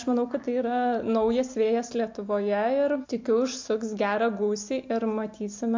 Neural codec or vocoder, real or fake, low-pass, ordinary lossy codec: none; real; 7.2 kHz; MP3, 48 kbps